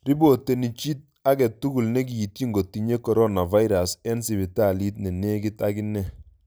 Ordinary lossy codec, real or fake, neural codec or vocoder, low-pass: none; real; none; none